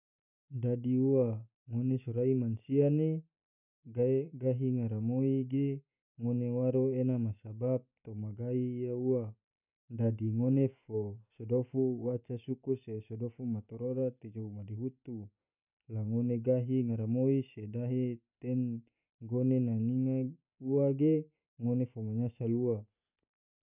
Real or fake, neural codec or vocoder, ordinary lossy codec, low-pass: real; none; none; 3.6 kHz